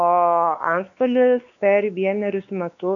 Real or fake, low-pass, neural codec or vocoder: fake; 7.2 kHz; codec, 16 kHz, 4 kbps, X-Codec, WavLM features, trained on Multilingual LibriSpeech